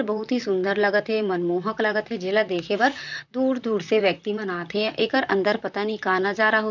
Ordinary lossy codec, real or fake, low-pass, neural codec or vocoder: none; fake; 7.2 kHz; vocoder, 44.1 kHz, 128 mel bands, Pupu-Vocoder